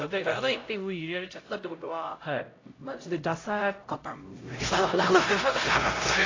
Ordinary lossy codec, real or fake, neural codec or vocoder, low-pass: AAC, 32 kbps; fake; codec, 16 kHz, 0.5 kbps, X-Codec, HuBERT features, trained on LibriSpeech; 7.2 kHz